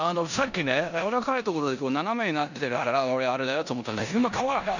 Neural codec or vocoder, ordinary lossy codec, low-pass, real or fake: codec, 16 kHz in and 24 kHz out, 0.9 kbps, LongCat-Audio-Codec, four codebook decoder; none; 7.2 kHz; fake